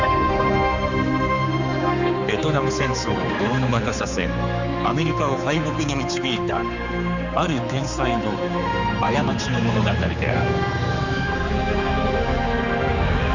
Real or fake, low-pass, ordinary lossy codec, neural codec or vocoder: fake; 7.2 kHz; none; codec, 16 kHz, 4 kbps, X-Codec, HuBERT features, trained on general audio